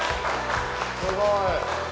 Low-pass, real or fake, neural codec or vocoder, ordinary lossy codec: none; real; none; none